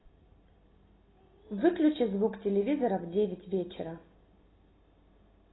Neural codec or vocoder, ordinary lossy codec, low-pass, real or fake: none; AAC, 16 kbps; 7.2 kHz; real